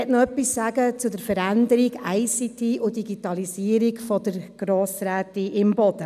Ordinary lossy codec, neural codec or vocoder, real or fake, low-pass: none; none; real; 14.4 kHz